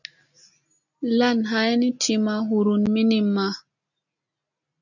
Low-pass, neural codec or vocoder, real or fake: 7.2 kHz; none; real